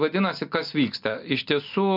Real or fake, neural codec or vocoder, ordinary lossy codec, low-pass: real; none; MP3, 48 kbps; 5.4 kHz